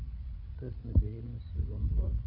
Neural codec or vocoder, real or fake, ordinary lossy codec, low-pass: codec, 16 kHz, 8 kbps, FreqCodec, larger model; fake; none; 5.4 kHz